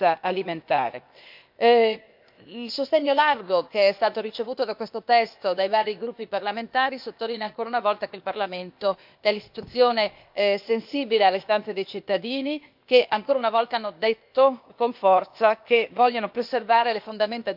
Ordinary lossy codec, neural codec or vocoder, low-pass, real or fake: MP3, 48 kbps; codec, 16 kHz, 0.8 kbps, ZipCodec; 5.4 kHz; fake